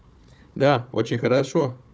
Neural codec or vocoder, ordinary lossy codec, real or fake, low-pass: codec, 16 kHz, 4 kbps, FunCodec, trained on Chinese and English, 50 frames a second; none; fake; none